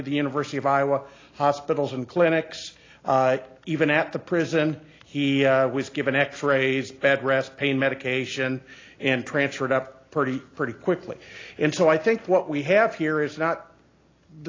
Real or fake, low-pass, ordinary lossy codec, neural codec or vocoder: real; 7.2 kHz; AAC, 32 kbps; none